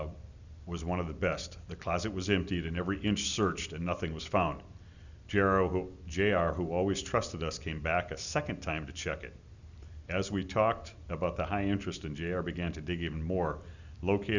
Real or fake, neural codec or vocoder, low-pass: real; none; 7.2 kHz